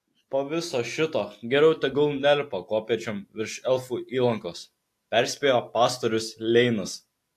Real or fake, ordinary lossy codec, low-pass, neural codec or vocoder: real; AAC, 64 kbps; 14.4 kHz; none